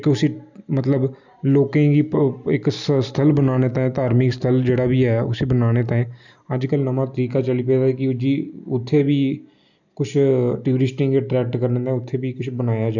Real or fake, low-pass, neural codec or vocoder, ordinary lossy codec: real; 7.2 kHz; none; none